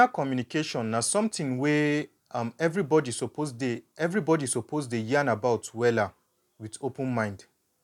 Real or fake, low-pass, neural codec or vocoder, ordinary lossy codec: real; 19.8 kHz; none; none